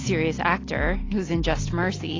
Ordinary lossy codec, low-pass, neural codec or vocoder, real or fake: AAC, 32 kbps; 7.2 kHz; none; real